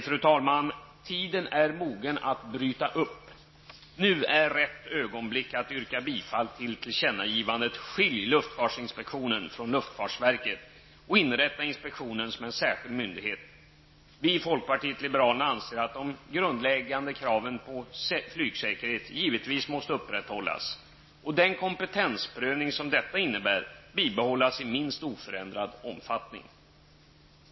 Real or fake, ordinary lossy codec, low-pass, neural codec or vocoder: real; MP3, 24 kbps; 7.2 kHz; none